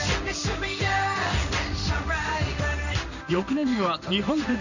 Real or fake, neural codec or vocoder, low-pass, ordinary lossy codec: fake; codec, 16 kHz in and 24 kHz out, 1 kbps, XY-Tokenizer; 7.2 kHz; none